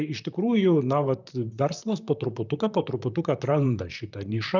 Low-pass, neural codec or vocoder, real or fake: 7.2 kHz; vocoder, 44.1 kHz, 128 mel bands, Pupu-Vocoder; fake